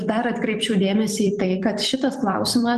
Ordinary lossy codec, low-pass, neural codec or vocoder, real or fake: Opus, 24 kbps; 14.4 kHz; vocoder, 44.1 kHz, 128 mel bands every 256 samples, BigVGAN v2; fake